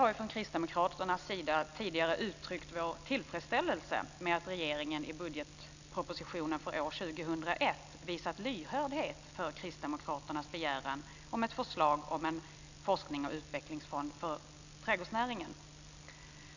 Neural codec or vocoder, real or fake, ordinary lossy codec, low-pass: none; real; none; 7.2 kHz